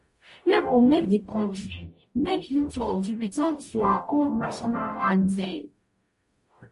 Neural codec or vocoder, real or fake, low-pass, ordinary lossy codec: codec, 44.1 kHz, 0.9 kbps, DAC; fake; 14.4 kHz; MP3, 48 kbps